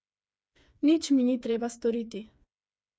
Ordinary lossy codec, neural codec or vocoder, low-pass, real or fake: none; codec, 16 kHz, 4 kbps, FreqCodec, smaller model; none; fake